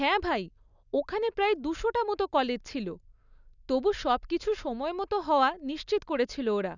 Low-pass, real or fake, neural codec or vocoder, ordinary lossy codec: 7.2 kHz; real; none; none